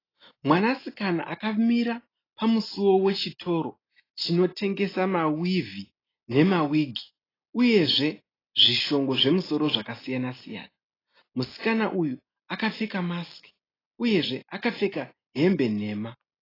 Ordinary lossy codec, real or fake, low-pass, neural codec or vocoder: AAC, 24 kbps; real; 5.4 kHz; none